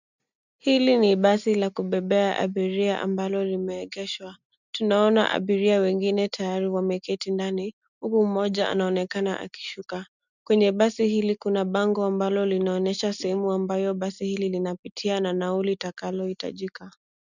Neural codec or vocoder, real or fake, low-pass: none; real; 7.2 kHz